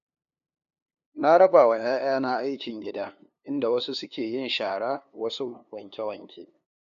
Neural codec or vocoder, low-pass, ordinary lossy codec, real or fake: codec, 16 kHz, 2 kbps, FunCodec, trained on LibriTTS, 25 frames a second; 7.2 kHz; none; fake